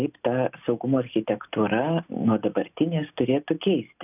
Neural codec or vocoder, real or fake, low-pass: none; real; 3.6 kHz